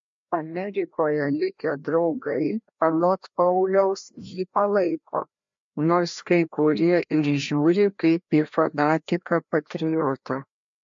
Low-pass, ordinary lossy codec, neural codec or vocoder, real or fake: 7.2 kHz; MP3, 48 kbps; codec, 16 kHz, 1 kbps, FreqCodec, larger model; fake